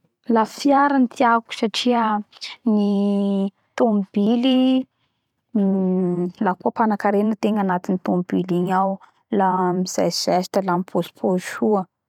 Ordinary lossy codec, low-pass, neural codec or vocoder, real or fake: none; 19.8 kHz; vocoder, 44.1 kHz, 128 mel bands every 512 samples, BigVGAN v2; fake